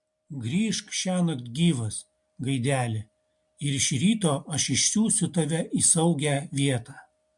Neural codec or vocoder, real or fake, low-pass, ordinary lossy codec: none; real; 10.8 kHz; MP3, 64 kbps